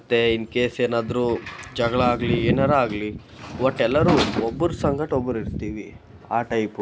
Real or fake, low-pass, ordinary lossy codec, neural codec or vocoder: real; none; none; none